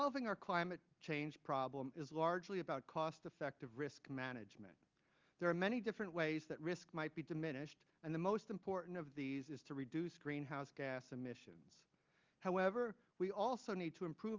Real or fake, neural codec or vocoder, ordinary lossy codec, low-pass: real; none; Opus, 24 kbps; 7.2 kHz